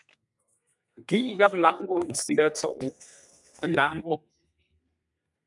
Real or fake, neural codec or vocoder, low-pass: fake; codec, 32 kHz, 1.9 kbps, SNAC; 10.8 kHz